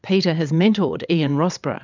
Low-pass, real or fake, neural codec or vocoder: 7.2 kHz; fake; vocoder, 22.05 kHz, 80 mel bands, WaveNeXt